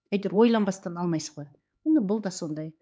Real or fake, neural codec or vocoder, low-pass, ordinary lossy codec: fake; codec, 16 kHz, 4 kbps, X-Codec, HuBERT features, trained on LibriSpeech; none; none